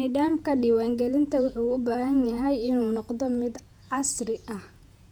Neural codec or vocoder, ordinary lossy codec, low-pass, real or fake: vocoder, 48 kHz, 128 mel bands, Vocos; none; 19.8 kHz; fake